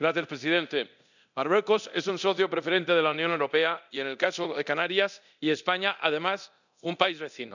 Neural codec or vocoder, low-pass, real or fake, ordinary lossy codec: codec, 24 kHz, 0.9 kbps, DualCodec; 7.2 kHz; fake; none